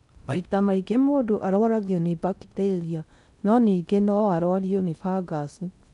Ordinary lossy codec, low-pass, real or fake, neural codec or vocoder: none; 10.8 kHz; fake; codec, 16 kHz in and 24 kHz out, 0.6 kbps, FocalCodec, streaming, 4096 codes